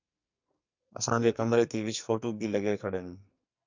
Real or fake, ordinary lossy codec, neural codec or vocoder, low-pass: fake; MP3, 64 kbps; codec, 44.1 kHz, 2.6 kbps, SNAC; 7.2 kHz